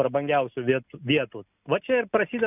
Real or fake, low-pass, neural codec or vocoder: real; 3.6 kHz; none